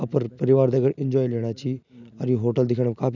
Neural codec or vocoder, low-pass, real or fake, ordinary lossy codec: none; 7.2 kHz; real; none